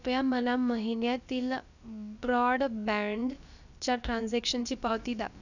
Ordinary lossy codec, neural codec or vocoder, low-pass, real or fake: none; codec, 16 kHz, about 1 kbps, DyCAST, with the encoder's durations; 7.2 kHz; fake